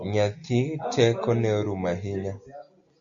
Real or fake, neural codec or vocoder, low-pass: real; none; 7.2 kHz